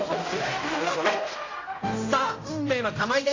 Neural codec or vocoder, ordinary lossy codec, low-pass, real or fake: codec, 16 kHz, 0.5 kbps, X-Codec, HuBERT features, trained on general audio; AAC, 32 kbps; 7.2 kHz; fake